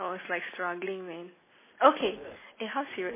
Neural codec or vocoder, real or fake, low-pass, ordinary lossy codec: none; real; 3.6 kHz; MP3, 16 kbps